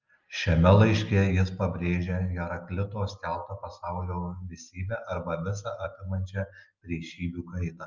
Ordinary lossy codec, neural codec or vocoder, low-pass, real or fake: Opus, 24 kbps; none; 7.2 kHz; real